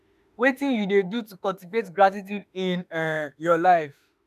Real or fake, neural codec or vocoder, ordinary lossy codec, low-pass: fake; autoencoder, 48 kHz, 32 numbers a frame, DAC-VAE, trained on Japanese speech; none; 14.4 kHz